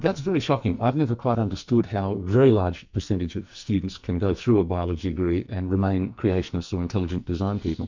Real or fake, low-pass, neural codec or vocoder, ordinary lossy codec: fake; 7.2 kHz; codec, 44.1 kHz, 2.6 kbps, SNAC; MP3, 64 kbps